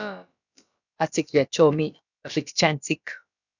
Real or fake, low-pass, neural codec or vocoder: fake; 7.2 kHz; codec, 16 kHz, about 1 kbps, DyCAST, with the encoder's durations